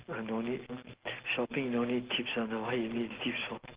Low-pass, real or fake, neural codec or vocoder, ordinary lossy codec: 3.6 kHz; real; none; Opus, 16 kbps